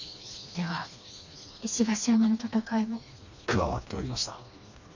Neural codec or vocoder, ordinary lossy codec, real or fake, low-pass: codec, 16 kHz, 2 kbps, FreqCodec, smaller model; none; fake; 7.2 kHz